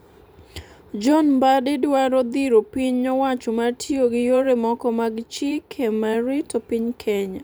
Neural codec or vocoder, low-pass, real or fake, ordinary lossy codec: vocoder, 44.1 kHz, 128 mel bands every 512 samples, BigVGAN v2; none; fake; none